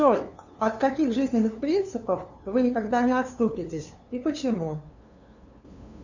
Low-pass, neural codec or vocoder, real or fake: 7.2 kHz; codec, 16 kHz, 2 kbps, FunCodec, trained on LibriTTS, 25 frames a second; fake